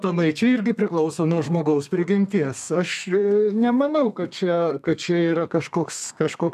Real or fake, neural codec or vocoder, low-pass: fake; codec, 32 kHz, 1.9 kbps, SNAC; 14.4 kHz